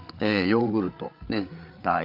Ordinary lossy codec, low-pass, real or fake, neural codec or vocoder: Opus, 24 kbps; 5.4 kHz; fake; codec, 16 kHz, 8 kbps, FreqCodec, larger model